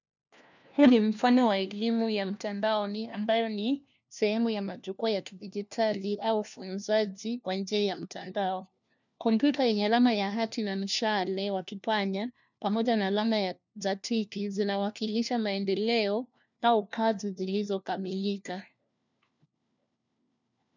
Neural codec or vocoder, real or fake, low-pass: codec, 16 kHz, 1 kbps, FunCodec, trained on LibriTTS, 50 frames a second; fake; 7.2 kHz